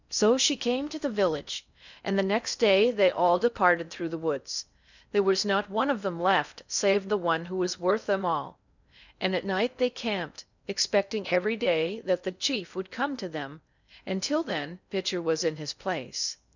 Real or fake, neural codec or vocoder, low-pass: fake; codec, 16 kHz in and 24 kHz out, 0.6 kbps, FocalCodec, streaming, 4096 codes; 7.2 kHz